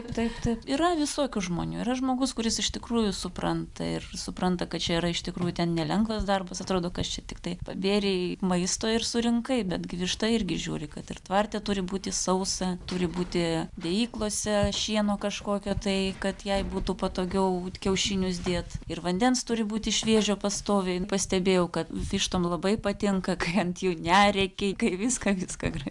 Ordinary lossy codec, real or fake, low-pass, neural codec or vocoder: MP3, 96 kbps; real; 10.8 kHz; none